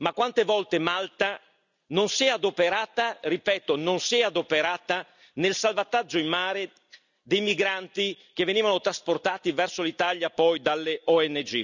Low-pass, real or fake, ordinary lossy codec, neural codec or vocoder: 7.2 kHz; real; none; none